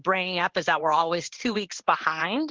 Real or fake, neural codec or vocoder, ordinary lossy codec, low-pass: fake; codec, 16 kHz in and 24 kHz out, 1 kbps, XY-Tokenizer; Opus, 16 kbps; 7.2 kHz